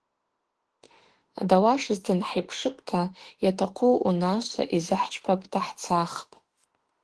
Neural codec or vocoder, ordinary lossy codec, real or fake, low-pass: autoencoder, 48 kHz, 32 numbers a frame, DAC-VAE, trained on Japanese speech; Opus, 16 kbps; fake; 10.8 kHz